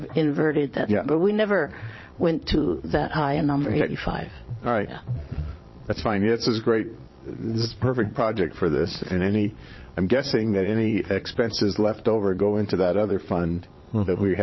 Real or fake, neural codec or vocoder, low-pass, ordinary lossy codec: fake; vocoder, 22.05 kHz, 80 mel bands, Vocos; 7.2 kHz; MP3, 24 kbps